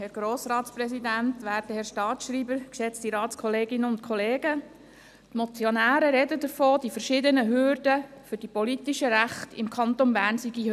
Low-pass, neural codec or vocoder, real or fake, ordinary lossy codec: 14.4 kHz; none; real; none